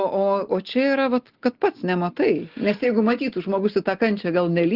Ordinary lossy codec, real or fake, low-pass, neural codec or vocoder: Opus, 16 kbps; real; 5.4 kHz; none